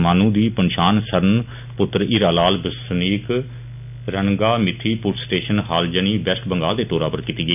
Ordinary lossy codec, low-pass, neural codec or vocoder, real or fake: none; 3.6 kHz; none; real